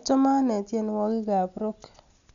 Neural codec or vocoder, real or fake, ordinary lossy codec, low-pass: none; real; none; 7.2 kHz